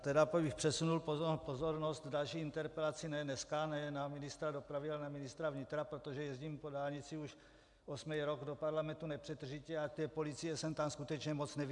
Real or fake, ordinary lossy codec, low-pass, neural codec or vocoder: real; MP3, 96 kbps; 10.8 kHz; none